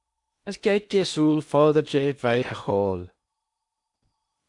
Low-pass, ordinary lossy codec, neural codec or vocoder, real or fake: 10.8 kHz; AAC, 64 kbps; codec, 16 kHz in and 24 kHz out, 0.8 kbps, FocalCodec, streaming, 65536 codes; fake